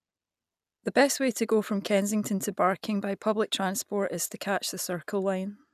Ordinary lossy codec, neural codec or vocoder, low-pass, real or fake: none; none; 14.4 kHz; real